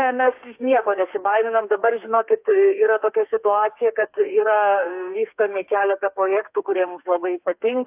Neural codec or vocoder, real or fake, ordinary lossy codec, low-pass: codec, 32 kHz, 1.9 kbps, SNAC; fake; AAC, 32 kbps; 3.6 kHz